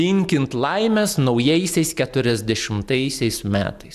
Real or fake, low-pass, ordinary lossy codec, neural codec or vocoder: real; 14.4 kHz; AAC, 96 kbps; none